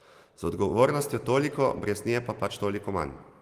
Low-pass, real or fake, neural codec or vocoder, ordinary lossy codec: 14.4 kHz; real; none; Opus, 24 kbps